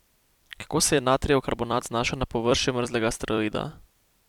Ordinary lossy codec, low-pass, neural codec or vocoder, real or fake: none; 19.8 kHz; vocoder, 44.1 kHz, 128 mel bands every 256 samples, BigVGAN v2; fake